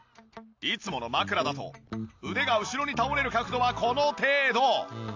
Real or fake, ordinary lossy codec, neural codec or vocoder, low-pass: real; AAC, 48 kbps; none; 7.2 kHz